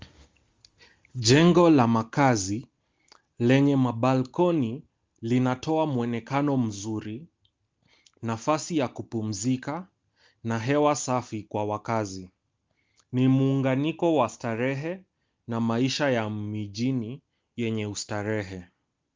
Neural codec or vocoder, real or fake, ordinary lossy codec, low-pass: none; real; Opus, 32 kbps; 7.2 kHz